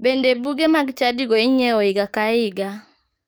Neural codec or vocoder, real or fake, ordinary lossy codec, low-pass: codec, 44.1 kHz, 7.8 kbps, DAC; fake; none; none